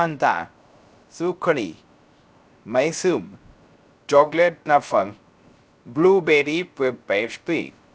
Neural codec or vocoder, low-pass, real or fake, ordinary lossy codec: codec, 16 kHz, 0.3 kbps, FocalCodec; none; fake; none